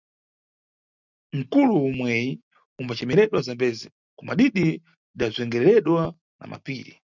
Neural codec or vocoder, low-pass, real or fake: none; 7.2 kHz; real